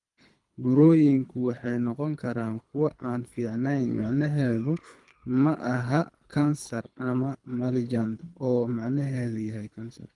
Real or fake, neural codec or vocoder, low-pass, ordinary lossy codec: fake; codec, 24 kHz, 3 kbps, HILCodec; 10.8 kHz; Opus, 32 kbps